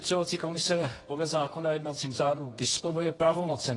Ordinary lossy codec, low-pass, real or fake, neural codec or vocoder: AAC, 32 kbps; 10.8 kHz; fake; codec, 24 kHz, 0.9 kbps, WavTokenizer, medium music audio release